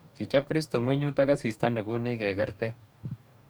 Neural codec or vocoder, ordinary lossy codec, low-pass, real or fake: codec, 44.1 kHz, 2.6 kbps, DAC; none; none; fake